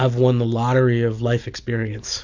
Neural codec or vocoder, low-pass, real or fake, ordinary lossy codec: none; 7.2 kHz; real; AAC, 48 kbps